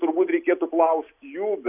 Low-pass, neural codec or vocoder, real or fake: 3.6 kHz; none; real